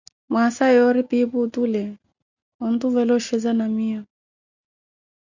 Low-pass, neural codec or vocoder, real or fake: 7.2 kHz; none; real